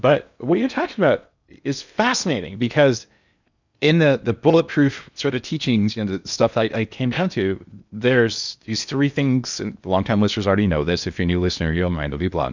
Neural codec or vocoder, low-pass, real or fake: codec, 16 kHz in and 24 kHz out, 0.8 kbps, FocalCodec, streaming, 65536 codes; 7.2 kHz; fake